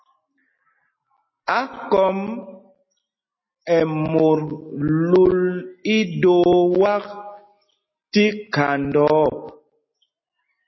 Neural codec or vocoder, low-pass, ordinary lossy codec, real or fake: none; 7.2 kHz; MP3, 24 kbps; real